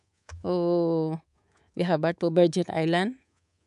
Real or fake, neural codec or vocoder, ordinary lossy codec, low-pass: fake; codec, 24 kHz, 3.1 kbps, DualCodec; none; 10.8 kHz